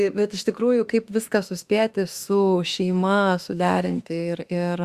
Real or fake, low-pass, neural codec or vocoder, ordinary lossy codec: fake; 14.4 kHz; autoencoder, 48 kHz, 32 numbers a frame, DAC-VAE, trained on Japanese speech; Opus, 64 kbps